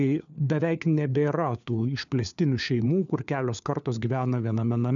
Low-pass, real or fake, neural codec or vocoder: 7.2 kHz; fake; codec, 16 kHz, 4 kbps, FunCodec, trained on LibriTTS, 50 frames a second